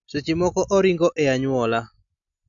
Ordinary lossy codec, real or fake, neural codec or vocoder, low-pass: none; real; none; 7.2 kHz